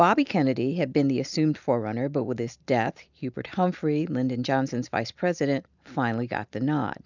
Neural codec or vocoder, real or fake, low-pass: none; real; 7.2 kHz